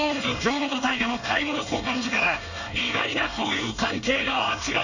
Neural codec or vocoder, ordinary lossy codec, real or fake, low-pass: codec, 24 kHz, 1 kbps, SNAC; none; fake; 7.2 kHz